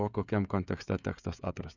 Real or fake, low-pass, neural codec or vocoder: fake; 7.2 kHz; codec, 16 kHz, 16 kbps, FreqCodec, smaller model